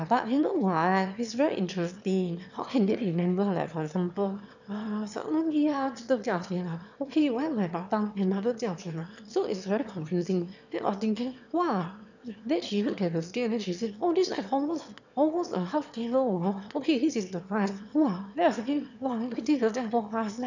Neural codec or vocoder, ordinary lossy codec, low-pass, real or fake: autoencoder, 22.05 kHz, a latent of 192 numbers a frame, VITS, trained on one speaker; none; 7.2 kHz; fake